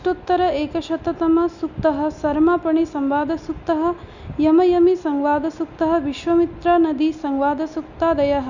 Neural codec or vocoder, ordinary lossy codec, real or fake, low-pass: none; none; real; 7.2 kHz